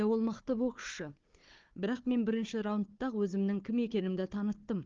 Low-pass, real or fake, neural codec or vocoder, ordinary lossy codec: 7.2 kHz; fake; codec, 16 kHz, 4 kbps, FunCodec, trained on Chinese and English, 50 frames a second; Opus, 32 kbps